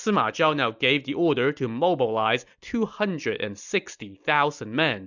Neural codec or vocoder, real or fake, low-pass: none; real; 7.2 kHz